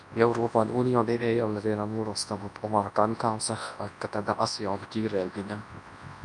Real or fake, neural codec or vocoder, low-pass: fake; codec, 24 kHz, 0.9 kbps, WavTokenizer, large speech release; 10.8 kHz